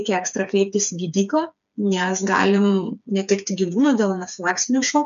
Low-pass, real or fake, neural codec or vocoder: 7.2 kHz; fake; codec, 16 kHz, 4 kbps, FreqCodec, smaller model